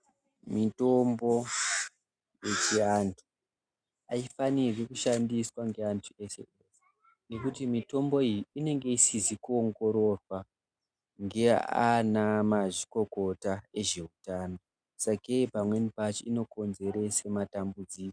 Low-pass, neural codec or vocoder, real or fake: 9.9 kHz; none; real